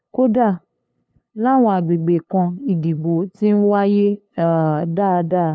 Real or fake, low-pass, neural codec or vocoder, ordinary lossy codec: fake; none; codec, 16 kHz, 2 kbps, FunCodec, trained on LibriTTS, 25 frames a second; none